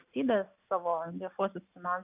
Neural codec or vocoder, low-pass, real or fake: none; 3.6 kHz; real